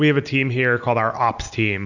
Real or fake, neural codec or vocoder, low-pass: real; none; 7.2 kHz